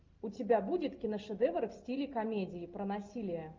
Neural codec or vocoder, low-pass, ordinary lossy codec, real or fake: none; 7.2 kHz; Opus, 32 kbps; real